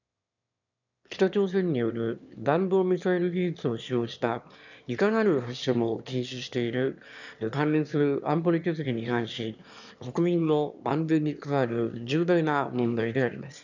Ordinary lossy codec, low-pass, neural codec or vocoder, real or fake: none; 7.2 kHz; autoencoder, 22.05 kHz, a latent of 192 numbers a frame, VITS, trained on one speaker; fake